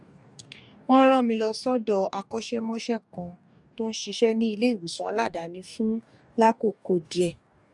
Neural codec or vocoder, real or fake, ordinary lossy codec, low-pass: codec, 44.1 kHz, 2.6 kbps, DAC; fake; none; 10.8 kHz